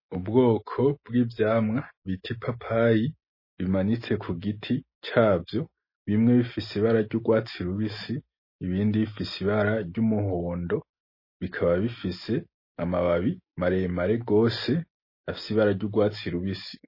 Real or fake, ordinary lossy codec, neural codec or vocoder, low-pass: real; MP3, 24 kbps; none; 5.4 kHz